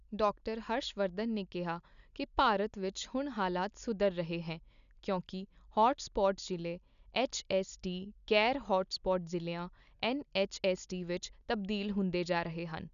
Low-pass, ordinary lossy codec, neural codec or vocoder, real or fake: 7.2 kHz; none; none; real